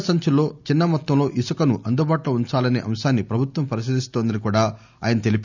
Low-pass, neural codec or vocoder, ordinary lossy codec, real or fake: 7.2 kHz; none; none; real